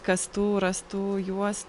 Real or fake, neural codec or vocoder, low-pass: real; none; 10.8 kHz